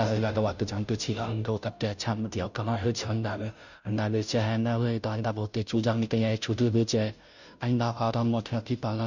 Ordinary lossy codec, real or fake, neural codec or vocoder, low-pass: none; fake; codec, 16 kHz, 0.5 kbps, FunCodec, trained on Chinese and English, 25 frames a second; 7.2 kHz